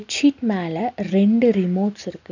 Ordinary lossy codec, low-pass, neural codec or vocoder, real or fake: none; 7.2 kHz; none; real